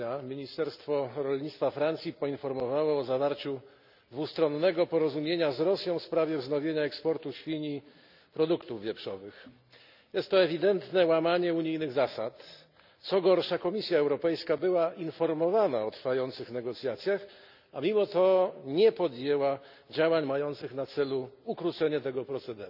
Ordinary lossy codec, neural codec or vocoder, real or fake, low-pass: none; none; real; 5.4 kHz